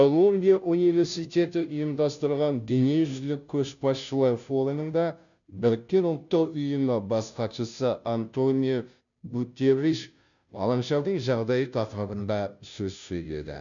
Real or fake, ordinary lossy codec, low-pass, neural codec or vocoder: fake; none; 7.2 kHz; codec, 16 kHz, 0.5 kbps, FunCodec, trained on Chinese and English, 25 frames a second